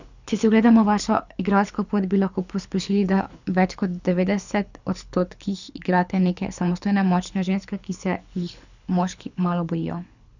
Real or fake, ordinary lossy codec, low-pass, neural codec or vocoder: fake; none; 7.2 kHz; codec, 24 kHz, 6 kbps, HILCodec